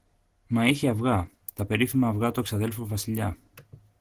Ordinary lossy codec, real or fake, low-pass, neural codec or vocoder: Opus, 16 kbps; real; 14.4 kHz; none